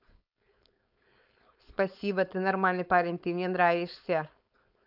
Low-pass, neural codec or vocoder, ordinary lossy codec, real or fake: 5.4 kHz; codec, 16 kHz, 4.8 kbps, FACodec; none; fake